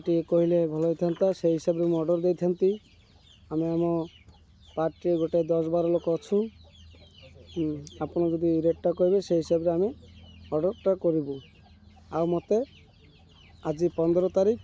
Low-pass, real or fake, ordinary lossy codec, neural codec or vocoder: none; real; none; none